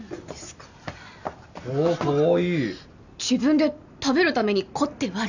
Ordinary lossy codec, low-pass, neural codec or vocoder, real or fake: none; 7.2 kHz; none; real